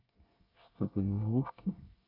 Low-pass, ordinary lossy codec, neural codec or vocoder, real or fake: 5.4 kHz; none; codec, 24 kHz, 1 kbps, SNAC; fake